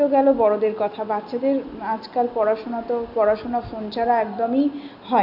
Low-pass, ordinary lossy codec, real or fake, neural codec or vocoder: 5.4 kHz; none; real; none